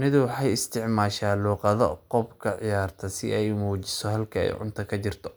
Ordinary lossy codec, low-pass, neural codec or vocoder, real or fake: none; none; none; real